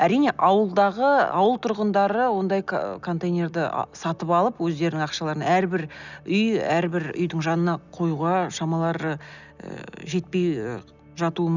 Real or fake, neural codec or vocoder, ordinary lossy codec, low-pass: real; none; none; 7.2 kHz